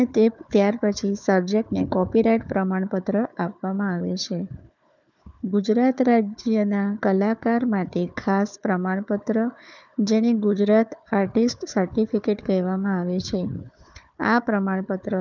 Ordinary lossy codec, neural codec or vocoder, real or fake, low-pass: none; codec, 16 kHz, 4 kbps, FunCodec, trained on Chinese and English, 50 frames a second; fake; 7.2 kHz